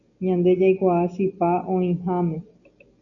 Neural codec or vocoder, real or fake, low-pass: none; real; 7.2 kHz